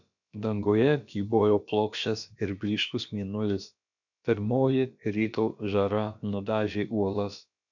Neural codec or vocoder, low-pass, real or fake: codec, 16 kHz, about 1 kbps, DyCAST, with the encoder's durations; 7.2 kHz; fake